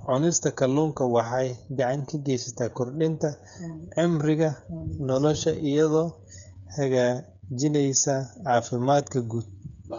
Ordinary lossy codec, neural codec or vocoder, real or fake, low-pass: none; codec, 16 kHz, 8 kbps, FreqCodec, smaller model; fake; 7.2 kHz